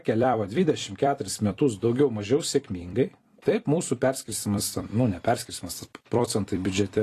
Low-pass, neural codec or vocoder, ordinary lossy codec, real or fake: 14.4 kHz; vocoder, 44.1 kHz, 128 mel bands every 256 samples, BigVGAN v2; AAC, 48 kbps; fake